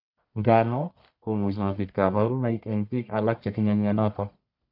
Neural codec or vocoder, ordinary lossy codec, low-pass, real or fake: codec, 44.1 kHz, 1.7 kbps, Pupu-Codec; none; 5.4 kHz; fake